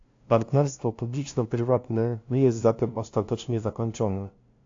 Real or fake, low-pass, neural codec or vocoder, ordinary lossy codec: fake; 7.2 kHz; codec, 16 kHz, 0.5 kbps, FunCodec, trained on LibriTTS, 25 frames a second; AAC, 48 kbps